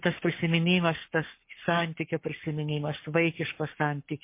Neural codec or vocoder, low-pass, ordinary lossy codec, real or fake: codec, 44.1 kHz, 7.8 kbps, Pupu-Codec; 3.6 kHz; MP3, 24 kbps; fake